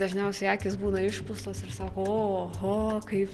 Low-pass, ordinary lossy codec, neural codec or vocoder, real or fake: 10.8 kHz; Opus, 16 kbps; none; real